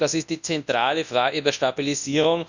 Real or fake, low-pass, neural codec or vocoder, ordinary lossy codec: fake; 7.2 kHz; codec, 24 kHz, 0.9 kbps, WavTokenizer, large speech release; none